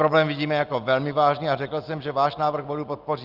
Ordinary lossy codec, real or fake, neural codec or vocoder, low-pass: Opus, 32 kbps; real; none; 5.4 kHz